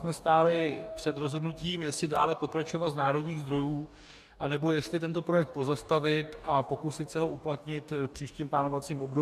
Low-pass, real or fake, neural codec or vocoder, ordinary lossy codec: 14.4 kHz; fake; codec, 44.1 kHz, 2.6 kbps, DAC; AAC, 96 kbps